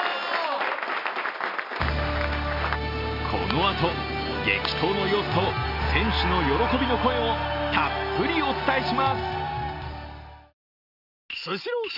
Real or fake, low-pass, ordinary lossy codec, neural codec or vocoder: real; 5.4 kHz; none; none